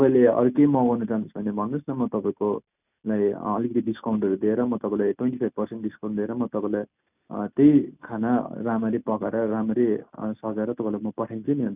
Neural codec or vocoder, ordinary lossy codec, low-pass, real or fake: none; none; 3.6 kHz; real